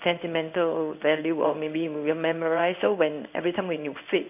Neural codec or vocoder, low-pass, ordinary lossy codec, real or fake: codec, 16 kHz in and 24 kHz out, 1 kbps, XY-Tokenizer; 3.6 kHz; none; fake